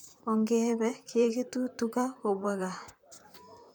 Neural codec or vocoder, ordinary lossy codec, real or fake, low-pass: vocoder, 44.1 kHz, 128 mel bands, Pupu-Vocoder; none; fake; none